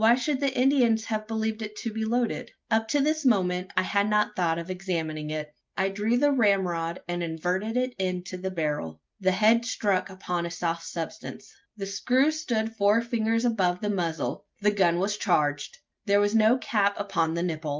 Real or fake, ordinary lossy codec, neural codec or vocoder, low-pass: real; Opus, 32 kbps; none; 7.2 kHz